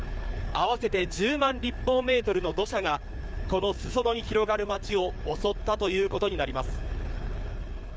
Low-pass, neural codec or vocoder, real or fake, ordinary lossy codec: none; codec, 16 kHz, 4 kbps, FreqCodec, larger model; fake; none